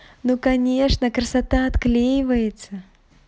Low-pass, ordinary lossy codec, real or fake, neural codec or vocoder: none; none; real; none